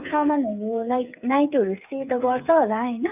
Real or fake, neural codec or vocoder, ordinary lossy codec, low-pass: fake; codec, 16 kHz, 8 kbps, FreqCodec, smaller model; none; 3.6 kHz